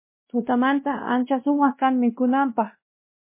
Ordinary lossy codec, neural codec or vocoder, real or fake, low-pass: MP3, 24 kbps; codec, 16 kHz, 1 kbps, X-Codec, WavLM features, trained on Multilingual LibriSpeech; fake; 3.6 kHz